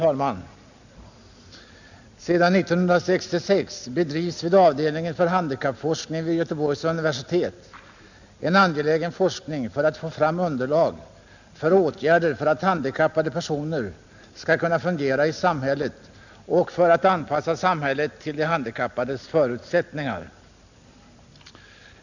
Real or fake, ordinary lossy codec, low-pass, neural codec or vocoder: real; none; 7.2 kHz; none